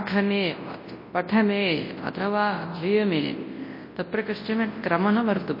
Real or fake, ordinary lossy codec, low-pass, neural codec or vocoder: fake; MP3, 24 kbps; 5.4 kHz; codec, 24 kHz, 0.9 kbps, WavTokenizer, large speech release